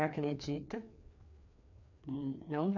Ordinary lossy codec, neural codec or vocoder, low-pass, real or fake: none; codec, 16 kHz, 2 kbps, FreqCodec, larger model; 7.2 kHz; fake